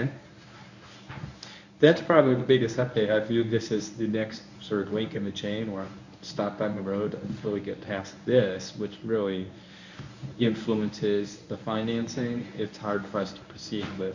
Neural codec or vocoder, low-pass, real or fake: codec, 24 kHz, 0.9 kbps, WavTokenizer, medium speech release version 1; 7.2 kHz; fake